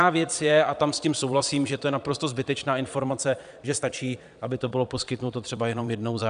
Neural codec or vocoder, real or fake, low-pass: vocoder, 22.05 kHz, 80 mel bands, Vocos; fake; 9.9 kHz